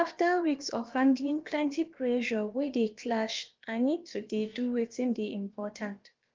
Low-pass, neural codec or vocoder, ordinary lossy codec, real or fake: 7.2 kHz; codec, 16 kHz, about 1 kbps, DyCAST, with the encoder's durations; Opus, 32 kbps; fake